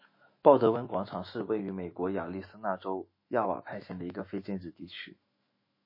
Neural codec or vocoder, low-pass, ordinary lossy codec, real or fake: autoencoder, 48 kHz, 128 numbers a frame, DAC-VAE, trained on Japanese speech; 5.4 kHz; MP3, 24 kbps; fake